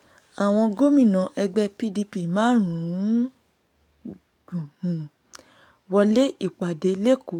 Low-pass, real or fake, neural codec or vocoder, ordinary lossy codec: 19.8 kHz; fake; codec, 44.1 kHz, 7.8 kbps, DAC; MP3, 96 kbps